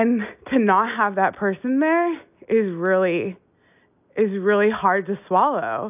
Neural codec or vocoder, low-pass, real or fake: none; 3.6 kHz; real